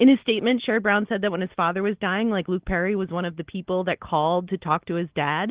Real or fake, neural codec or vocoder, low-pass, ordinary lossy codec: real; none; 3.6 kHz; Opus, 16 kbps